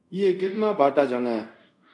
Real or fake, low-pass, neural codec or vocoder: fake; 10.8 kHz; codec, 24 kHz, 0.5 kbps, DualCodec